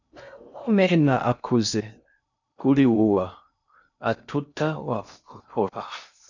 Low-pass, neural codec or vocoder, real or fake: 7.2 kHz; codec, 16 kHz in and 24 kHz out, 0.6 kbps, FocalCodec, streaming, 2048 codes; fake